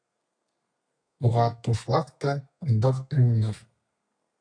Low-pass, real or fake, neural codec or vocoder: 9.9 kHz; fake; codec, 32 kHz, 1.9 kbps, SNAC